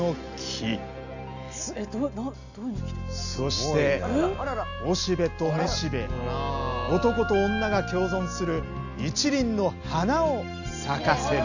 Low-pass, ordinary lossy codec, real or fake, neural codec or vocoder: 7.2 kHz; none; real; none